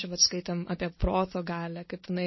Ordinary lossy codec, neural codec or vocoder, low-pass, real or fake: MP3, 24 kbps; none; 7.2 kHz; real